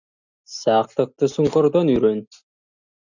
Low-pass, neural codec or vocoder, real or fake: 7.2 kHz; none; real